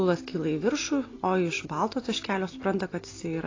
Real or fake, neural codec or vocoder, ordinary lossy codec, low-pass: real; none; AAC, 32 kbps; 7.2 kHz